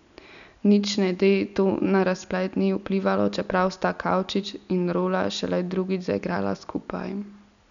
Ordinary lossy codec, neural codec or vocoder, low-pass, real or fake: none; none; 7.2 kHz; real